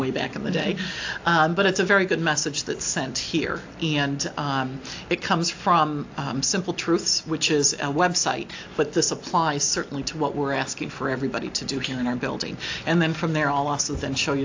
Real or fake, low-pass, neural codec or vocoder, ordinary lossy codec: real; 7.2 kHz; none; AAC, 48 kbps